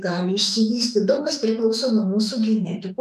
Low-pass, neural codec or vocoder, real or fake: 14.4 kHz; autoencoder, 48 kHz, 32 numbers a frame, DAC-VAE, trained on Japanese speech; fake